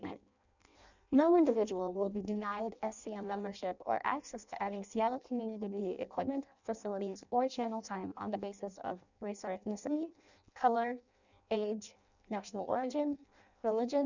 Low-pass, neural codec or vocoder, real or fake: 7.2 kHz; codec, 16 kHz in and 24 kHz out, 0.6 kbps, FireRedTTS-2 codec; fake